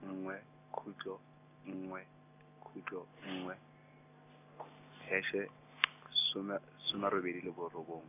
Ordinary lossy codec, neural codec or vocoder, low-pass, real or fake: none; none; 3.6 kHz; real